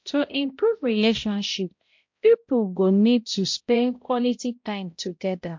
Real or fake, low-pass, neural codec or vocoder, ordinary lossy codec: fake; 7.2 kHz; codec, 16 kHz, 0.5 kbps, X-Codec, HuBERT features, trained on balanced general audio; MP3, 48 kbps